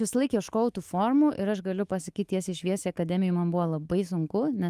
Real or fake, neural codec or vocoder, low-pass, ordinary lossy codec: fake; autoencoder, 48 kHz, 128 numbers a frame, DAC-VAE, trained on Japanese speech; 14.4 kHz; Opus, 24 kbps